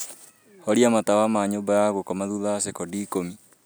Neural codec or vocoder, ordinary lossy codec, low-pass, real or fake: none; none; none; real